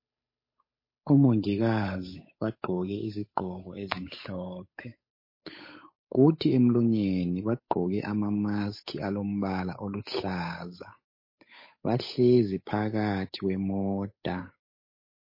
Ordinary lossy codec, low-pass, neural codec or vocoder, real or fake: MP3, 24 kbps; 5.4 kHz; codec, 16 kHz, 8 kbps, FunCodec, trained on Chinese and English, 25 frames a second; fake